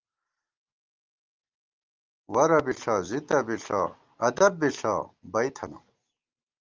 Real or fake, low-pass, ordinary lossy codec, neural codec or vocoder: real; 7.2 kHz; Opus, 32 kbps; none